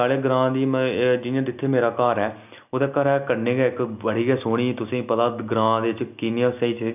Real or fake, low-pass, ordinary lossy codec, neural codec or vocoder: real; 3.6 kHz; none; none